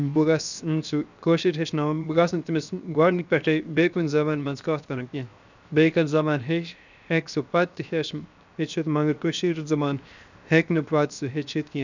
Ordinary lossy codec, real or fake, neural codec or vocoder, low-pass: none; fake; codec, 16 kHz, 0.7 kbps, FocalCodec; 7.2 kHz